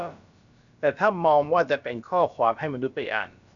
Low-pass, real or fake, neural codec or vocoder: 7.2 kHz; fake; codec, 16 kHz, about 1 kbps, DyCAST, with the encoder's durations